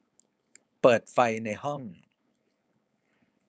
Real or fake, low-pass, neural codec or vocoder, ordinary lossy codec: fake; none; codec, 16 kHz, 4.8 kbps, FACodec; none